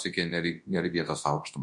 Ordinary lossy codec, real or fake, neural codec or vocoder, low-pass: MP3, 48 kbps; fake; codec, 24 kHz, 0.9 kbps, WavTokenizer, large speech release; 10.8 kHz